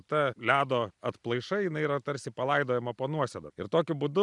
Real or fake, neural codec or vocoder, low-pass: fake; vocoder, 44.1 kHz, 128 mel bands every 256 samples, BigVGAN v2; 10.8 kHz